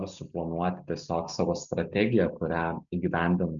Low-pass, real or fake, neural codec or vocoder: 7.2 kHz; real; none